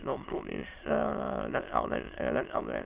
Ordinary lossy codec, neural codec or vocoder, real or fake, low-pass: Opus, 24 kbps; autoencoder, 22.05 kHz, a latent of 192 numbers a frame, VITS, trained on many speakers; fake; 3.6 kHz